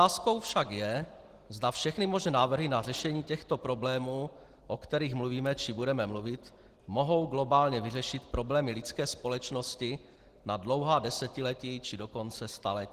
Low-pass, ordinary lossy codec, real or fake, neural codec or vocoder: 14.4 kHz; Opus, 16 kbps; real; none